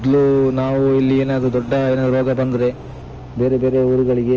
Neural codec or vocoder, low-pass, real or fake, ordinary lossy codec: none; 7.2 kHz; real; Opus, 24 kbps